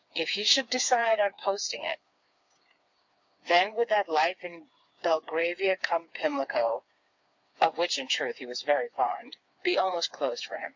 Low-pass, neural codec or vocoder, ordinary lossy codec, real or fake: 7.2 kHz; codec, 16 kHz, 4 kbps, FreqCodec, smaller model; MP3, 48 kbps; fake